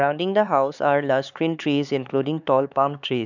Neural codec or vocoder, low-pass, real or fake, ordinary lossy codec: codec, 16 kHz, 4 kbps, X-Codec, HuBERT features, trained on LibriSpeech; 7.2 kHz; fake; none